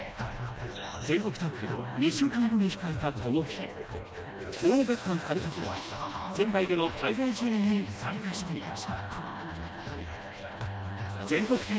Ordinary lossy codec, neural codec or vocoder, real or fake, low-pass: none; codec, 16 kHz, 1 kbps, FreqCodec, smaller model; fake; none